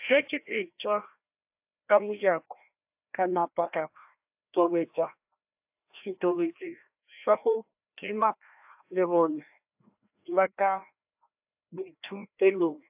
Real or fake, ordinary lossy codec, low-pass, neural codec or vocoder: fake; AAC, 32 kbps; 3.6 kHz; codec, 16 kHz, 1 kbps, FreqCodec, larger model